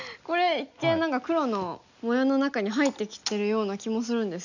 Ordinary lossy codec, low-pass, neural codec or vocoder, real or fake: none; 7.2 kHz; none; real